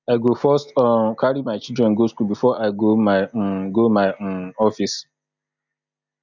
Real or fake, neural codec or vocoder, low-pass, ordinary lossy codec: real; none; 7.2 kHz; none